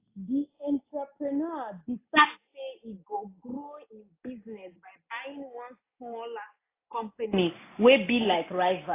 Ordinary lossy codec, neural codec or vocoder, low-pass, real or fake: AAC, 24 kbps; none; 3.6 kHz; real